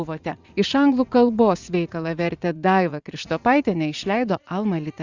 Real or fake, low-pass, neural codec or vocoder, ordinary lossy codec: fake; 7.2 kHz; vocoder, 44.1 kHz, 80 mel bands, Vocos; Opus, 64 kbps